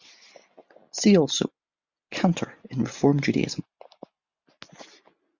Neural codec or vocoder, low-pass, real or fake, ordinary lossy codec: none; 7.2 kHz; real; Opus, 64 kbps